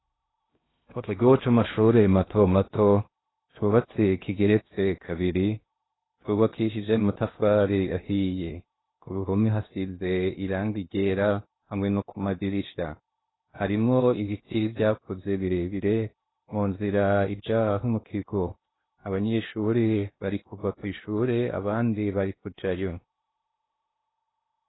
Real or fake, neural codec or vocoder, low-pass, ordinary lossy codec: fake; codec, 16 kHz in and 24 kHz out, 0.6 kbps, FocalCodec, streaming, 2048 codes; 7.2 kHz; AAC, 16 kbps